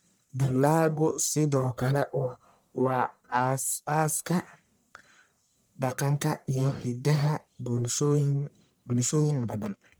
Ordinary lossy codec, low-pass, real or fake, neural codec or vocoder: none; none; fake; codec, 44.1 kHz, 1.7 kbps, Pupu-Codec